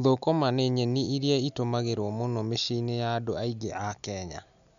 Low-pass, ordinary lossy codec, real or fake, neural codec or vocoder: 7.2 kHz; none; real; none